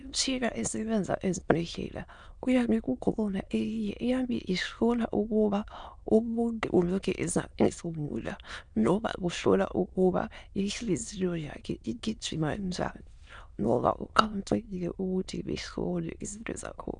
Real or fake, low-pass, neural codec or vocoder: fake; 9.9 kHz; autoencoder, 22.05 kHz, a latent of 192 numbers a frame, VITS, trained on many speakers